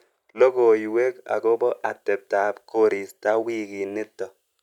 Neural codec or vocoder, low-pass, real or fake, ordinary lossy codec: none; 19.8 kHz; real; none